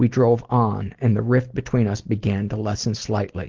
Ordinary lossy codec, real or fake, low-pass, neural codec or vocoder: Opus, 16 kbps; real; 7.2 kHz; none